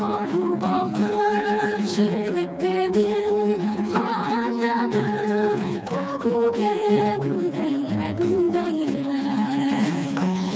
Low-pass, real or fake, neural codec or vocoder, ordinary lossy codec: none; fake; codec, 16 kHz, 2 kbps, FreqCodec, smaller model; none